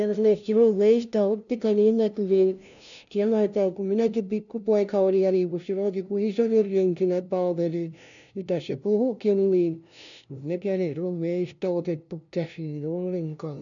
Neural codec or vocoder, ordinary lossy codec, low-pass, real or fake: codec, 16 kHz, 0.5 kbps, FunCodec, trained on LibriTTS, 25 frames a second; none; 7.2 kHz; fake